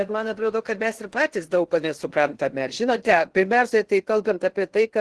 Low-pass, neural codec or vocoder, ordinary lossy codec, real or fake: 10.8 kHz; codec, 16 kHz in and 24 kHz out, 0.6 kbps, FocalCodec, streaming, 2048 codes; Opus, 16 kbps; fake